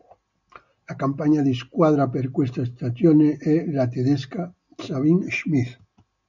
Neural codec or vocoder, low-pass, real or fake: none; 7.2 kHz; real